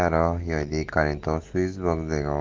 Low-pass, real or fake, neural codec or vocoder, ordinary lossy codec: 7.2 kHz; real; none; Opus, 16 kbps